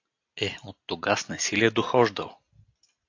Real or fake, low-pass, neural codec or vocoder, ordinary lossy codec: real; 7.2 kHz; none; AAC, 48 kbps